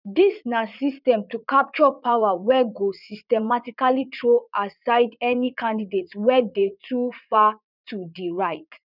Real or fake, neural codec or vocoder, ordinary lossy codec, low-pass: fake; vocoder, 44.1 kHz, 80 mel bands, Vocos; none; 5.4 kHz